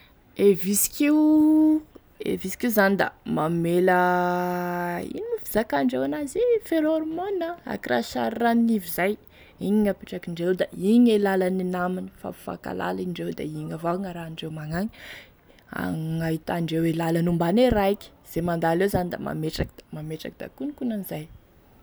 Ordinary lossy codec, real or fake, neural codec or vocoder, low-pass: none; real; none; none